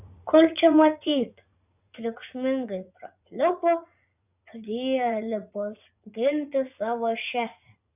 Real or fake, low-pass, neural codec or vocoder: fake; 3.6 kHz; vocoder, 44.1 kHz, 80 mel bands, Vocos